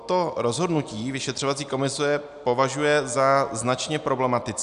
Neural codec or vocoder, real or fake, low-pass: none; real; 10.8 kHz